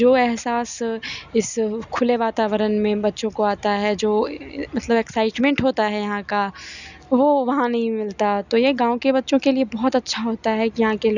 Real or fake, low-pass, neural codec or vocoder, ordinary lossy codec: real; 7.2 kHz; none; none